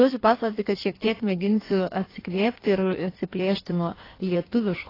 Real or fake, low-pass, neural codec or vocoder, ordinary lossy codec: fake; 5.4 kHz; codec, 16 kHz in and 24 kHz out, 1.1 kbps, FireRedTTS-2 codec; AAC, 24 kbps